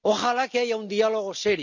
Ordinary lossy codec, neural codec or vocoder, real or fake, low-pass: none; none; real; 7.2 kHz